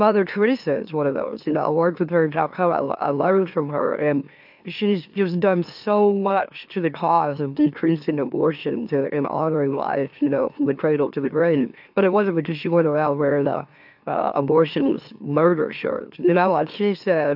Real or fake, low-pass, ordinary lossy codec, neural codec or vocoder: fake; 5.4 kHz; AAC, 48 kbps; autoencoder, 44.1 kHz, a latent of 192 numbers a frame, MeloTTS